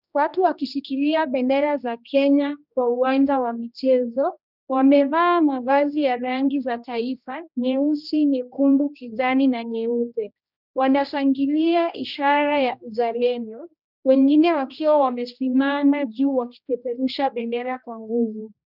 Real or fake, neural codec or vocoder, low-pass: fake; codec, 16 kHz, 1 kbps, X-Codec, HuBERT features, trained on general audio; 5.4 kHz